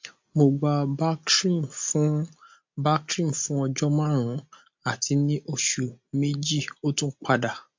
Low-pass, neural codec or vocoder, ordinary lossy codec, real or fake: 7.2 kHz; none; MP3, 48 kbps; real